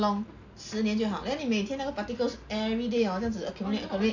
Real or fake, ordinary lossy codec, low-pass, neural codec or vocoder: real; AAC, 48 kbps; 7.2 kHz; none